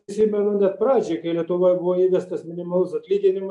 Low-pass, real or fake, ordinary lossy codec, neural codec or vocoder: 10.8 kHz; real; AAC, 64 kbps; none